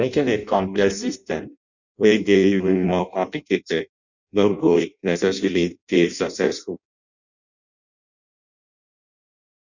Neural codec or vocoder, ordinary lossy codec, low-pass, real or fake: codec, 16 kHz in and 24 kHz out, 0.6 kbps, FireRedTTS-2 codec; none; 7.2 kHz; fake